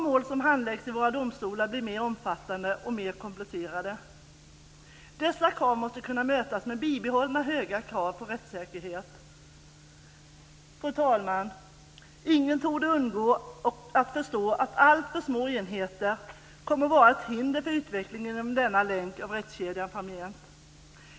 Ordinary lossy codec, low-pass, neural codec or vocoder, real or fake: none; none; none; real